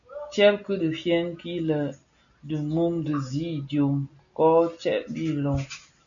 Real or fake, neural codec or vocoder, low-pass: real; none; 7.2 kHz